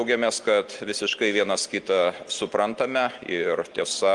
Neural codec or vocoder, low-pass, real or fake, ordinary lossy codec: none; 10.8 kHz; real; Opus, 24 kbps